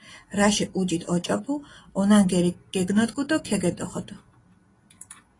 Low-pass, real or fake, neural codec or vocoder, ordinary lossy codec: 10.8 kHz; real; none; AAC, 32 kbps